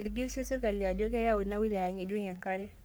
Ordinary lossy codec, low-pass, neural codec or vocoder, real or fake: none; none; codec, 44.1 kHz, 3.4 kbps, Pupu-Codec; fake